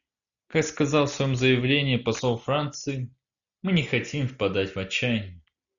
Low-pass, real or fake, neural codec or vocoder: 7.2 kHz; real; none